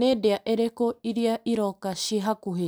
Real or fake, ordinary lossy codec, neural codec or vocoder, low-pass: real; none; none; none